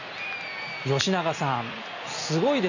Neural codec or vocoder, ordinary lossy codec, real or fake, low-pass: none; none; real; 7.2 kHz